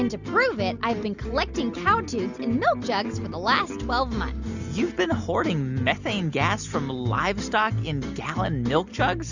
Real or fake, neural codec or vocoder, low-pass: real; none; 7.2 kHz